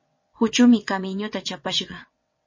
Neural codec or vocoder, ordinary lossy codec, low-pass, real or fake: vocoder, 22.05 kHz, 80 mel bands, WaveNeXt; MP3, 32 kbps; 7.2 kHz; fake